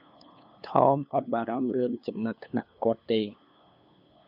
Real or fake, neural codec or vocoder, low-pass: fake; codec, 16 kHz, 2 kbps, FunCodec, trained on LibriTTS, 25 frames a second; 5.4 kHz